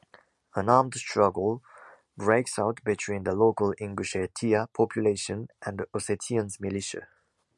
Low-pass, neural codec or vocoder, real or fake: 10.8 kHz; none; real